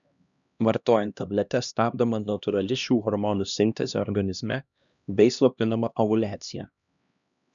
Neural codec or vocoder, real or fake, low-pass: codec, 16 kHz, 1 kbps, X-Codec, HuBERT features, trained on LibriSpeech; fake; 7.2 kHz